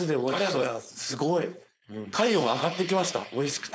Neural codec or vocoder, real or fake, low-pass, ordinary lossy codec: codec, 16 kHz, 4.8 kbps, FACodec; fake; none; none